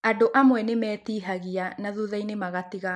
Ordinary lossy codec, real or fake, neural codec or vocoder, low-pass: none; real; none; none